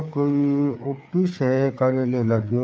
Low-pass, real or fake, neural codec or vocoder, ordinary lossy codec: none; fake; codec, 16 kHz, 4 kbps, FreqCodec, larger model; none